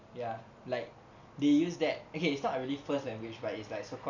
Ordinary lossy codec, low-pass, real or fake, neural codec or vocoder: none; 7.2 kHz; real; none